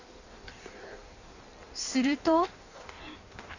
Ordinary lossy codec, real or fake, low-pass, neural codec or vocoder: none; fake; 7.2 kHz; codec, 16 kHz in and 24 kHz out, 1.1 kbps, FireRedTTS-2 codec